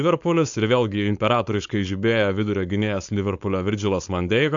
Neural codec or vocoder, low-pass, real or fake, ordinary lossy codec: codec, 16 kHz, 4.8 kbps, FACodec; 7.2 kHz; fake; AAC, 64 kbps